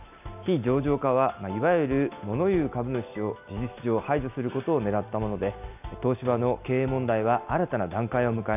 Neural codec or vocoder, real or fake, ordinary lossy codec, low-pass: none; real; AAC, 32 kbps; 3.6 kHz